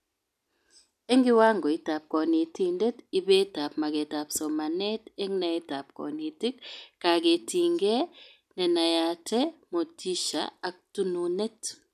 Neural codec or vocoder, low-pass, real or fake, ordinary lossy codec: none; 14.4 kHz; real; none